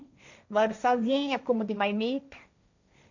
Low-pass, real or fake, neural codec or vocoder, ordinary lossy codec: 7.2 kHz; fake; codec, 16 kHz, 1.1 kbps, Voila-Tokenizer; none